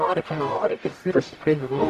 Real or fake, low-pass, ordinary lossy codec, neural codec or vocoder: fake; 14.4 kHz; Opus, 64 kbps; codec, 44.1 kHz, 0.9 kbps, DAC